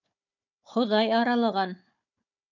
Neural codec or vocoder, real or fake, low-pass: codec, 16 kHz, 4 kbps, FunCodec, trained on Chinese and English, 50 frames a second; fake; 7.2 kHz